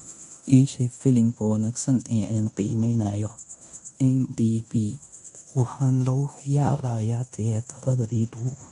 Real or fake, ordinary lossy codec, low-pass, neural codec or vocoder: fake; none; 10.8 kHz; codec, 16 kHz in and 24 kHz out, 0.9 kbps, LongCat-Audio-Codec, fine tuned four codebook decoder